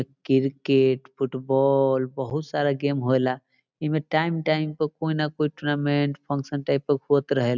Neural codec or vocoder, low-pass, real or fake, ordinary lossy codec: none; 7.2 kHz; real; none